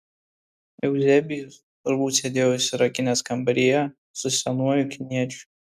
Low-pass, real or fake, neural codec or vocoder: 14.4 kHz; real; none